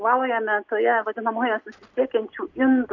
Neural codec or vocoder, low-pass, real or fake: none; 7.2 kHz; real